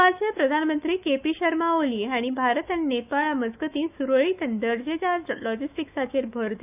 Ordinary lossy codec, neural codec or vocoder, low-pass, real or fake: none; autoencoder, 48 kHz, 128 numbers a frame, DAC-VAE, trained on Japanese speech; 3.6 kHz; fake